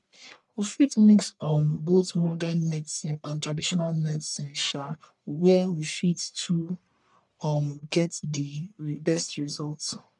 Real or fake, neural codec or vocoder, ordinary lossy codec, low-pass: fake; codec, 44.1 kHz, 1.7 kbps, Pupu-Codec; none; 10.8 kHz